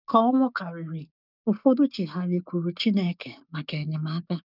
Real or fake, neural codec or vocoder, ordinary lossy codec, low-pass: fake; codec, 44.1 kHz, 7.8 kbps, DAC; none; 5.4 kHz